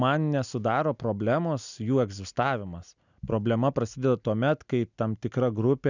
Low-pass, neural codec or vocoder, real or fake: 7.2 kHz; none; real